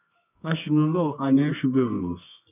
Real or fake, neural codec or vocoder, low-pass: fake; codec, 24 kHz, 0.9 kbps, WavTokenizer, medium music audio release; 3.6 kHz